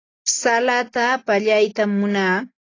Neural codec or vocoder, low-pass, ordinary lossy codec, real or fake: none; 7.2 kHz; AAC, 32 kbps; real